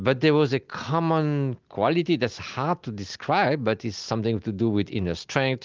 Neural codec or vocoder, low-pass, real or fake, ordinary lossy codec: none; 7.2 kHz; real; Opus, 32 kbps